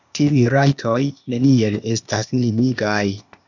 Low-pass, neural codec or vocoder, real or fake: 7.2 kHz; codec, 16 kHz, 0.8 kbps, ZipCodec; fake